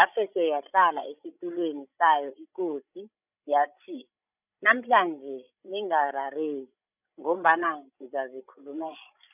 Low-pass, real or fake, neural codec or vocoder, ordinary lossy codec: 3.6 kHz; fake; codec, 16 kHz, 16 kbps, FreqCodec, larger model; none